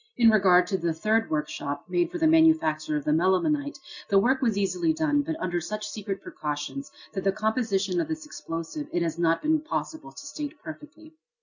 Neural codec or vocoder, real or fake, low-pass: none; real; 7.2 kHz